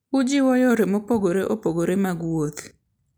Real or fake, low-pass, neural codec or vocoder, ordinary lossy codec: real; none; none; none